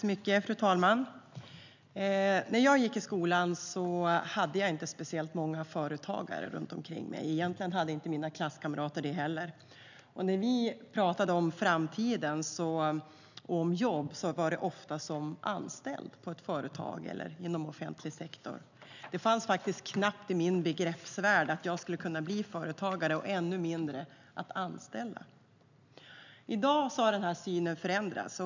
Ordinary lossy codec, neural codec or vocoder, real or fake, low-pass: none; none; real; 7.2 kHz